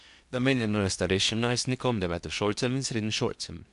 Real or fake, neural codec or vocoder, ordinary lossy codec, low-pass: fake; codec, 16 kHz in and 24 kHz out, 0.8 kbps, FocalCodec, streaming, 65536 codes; none; 10.8 kHz